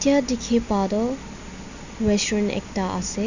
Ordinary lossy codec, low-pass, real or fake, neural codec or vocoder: none; 7.2 kHz; real; none